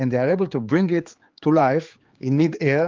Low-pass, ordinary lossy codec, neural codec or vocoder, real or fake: 7.2 kHz; Opus, 32 kbps; codec, 16 kHz, 4 kbps, X-Codec, HuBERT features, trained on general audio; fake